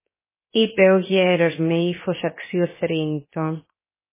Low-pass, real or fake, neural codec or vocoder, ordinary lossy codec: 3.6 kHz; fake; codec, 16 kHz, 0.7 kbps, FocalCodec; MP3, 16 kbps